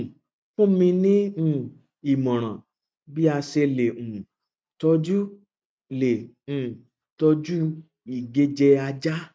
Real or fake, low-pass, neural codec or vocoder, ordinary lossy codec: real; none; none; none